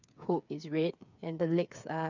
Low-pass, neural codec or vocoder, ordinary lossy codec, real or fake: 7.2 kHz; codec, 16 kHz, 8 kbps, FreqCodec, smaller model; none; fake